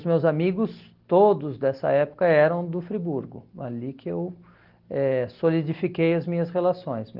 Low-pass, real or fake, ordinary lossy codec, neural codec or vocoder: 5.4 kHz; real; Opus, 16 kbps; none